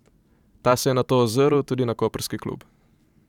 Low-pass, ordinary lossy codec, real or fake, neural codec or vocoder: 19.8 kHz; none; fake; vocoder, 44.1 kHz, 128 mel bands every 512 samples, BigVGAN v2